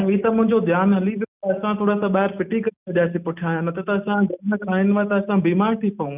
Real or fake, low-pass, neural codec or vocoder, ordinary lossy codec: real; 3.6 kHz; none; none